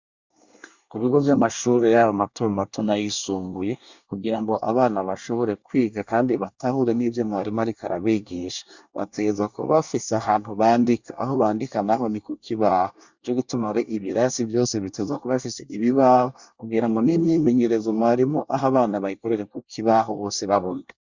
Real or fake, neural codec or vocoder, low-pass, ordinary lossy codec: fake; codec, 24 kHz, 1 kbps, SNAC; 7.2 kHz; Opus, 64 kbps